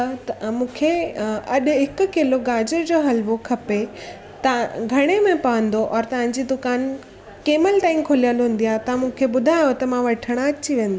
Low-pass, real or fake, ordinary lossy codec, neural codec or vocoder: none; real; none; none